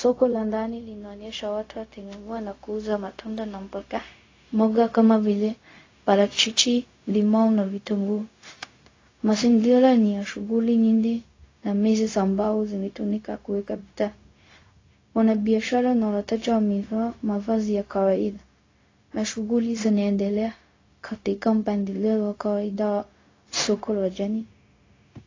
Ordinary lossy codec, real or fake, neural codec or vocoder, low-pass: AAC, 32 kbps; fake; codec, 16 kHz, 0.4 kbps, LongCat-Audio-Codec; 7.2 kHz